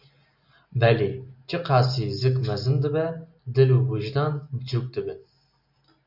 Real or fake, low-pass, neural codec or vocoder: real; 5.4 kHz; none